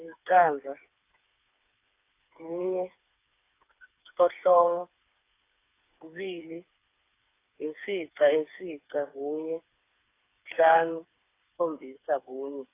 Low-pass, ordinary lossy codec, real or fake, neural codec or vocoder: 3.6 kHz; AAC, 24 kbps; fake; codec, 16 kHz, 4 kbps, FreqCodec, smaller model